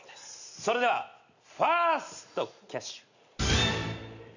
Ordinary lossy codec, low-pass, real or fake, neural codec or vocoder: none; 7.2 kHz; real; none